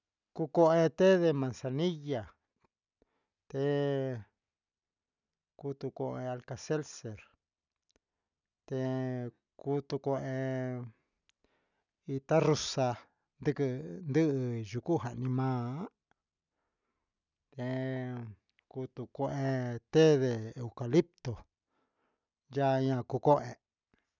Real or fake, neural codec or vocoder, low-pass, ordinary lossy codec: real; none; 7.2 kHz; none